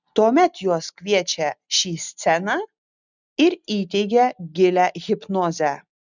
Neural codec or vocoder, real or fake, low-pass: none; real; 7.2 kHz